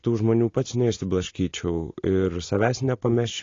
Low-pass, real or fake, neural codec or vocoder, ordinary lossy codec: 7.2 kHz; real; none; AAC, 32 kbps